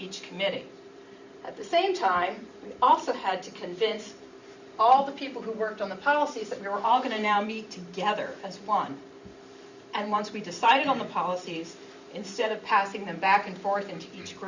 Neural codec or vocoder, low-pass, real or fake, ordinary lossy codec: vocoder, 44.1 kHz, 128 mel bands every 512 samples, BigVGAN v2; 7.2 kHz; fake; Opus, 64 kbps